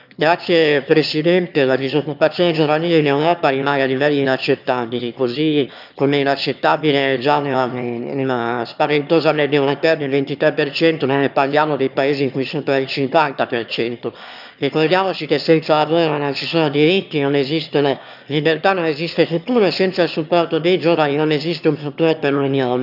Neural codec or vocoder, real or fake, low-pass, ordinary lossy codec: autoencoder, 22.05 kHz, a latent of 192 numbers a frame, VITS, trained on one speaker; fake; 5.4 kHz; none